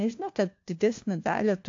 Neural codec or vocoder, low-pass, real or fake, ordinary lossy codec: codec, 16 kHz, 1 kbps, FunCodec, trained on LibriTTS, 50 frames a second; 7.2 kHz; fake; AAC, 48 kbps